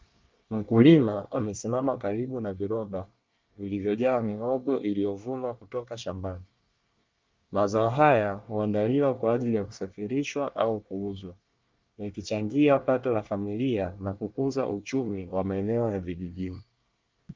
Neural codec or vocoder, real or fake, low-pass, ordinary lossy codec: codec, 24 kHz, 1 kbps, SNAC; fake; 7.2 kHz; Opus, 24 kbps